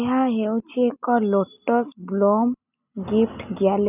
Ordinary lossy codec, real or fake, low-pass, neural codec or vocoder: none; real; 3.6 kHz; none